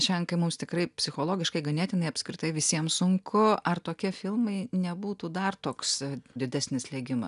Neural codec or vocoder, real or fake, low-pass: none; real; 10.8 kHz